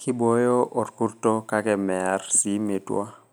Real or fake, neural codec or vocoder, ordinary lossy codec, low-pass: real; none; none; none